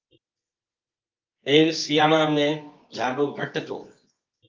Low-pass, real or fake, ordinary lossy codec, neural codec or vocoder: 7.2 kHz; fake; Opus, 24 kbps; codec, 24 kHz, 0.9 kbps, WavTokenizer, medium music audio release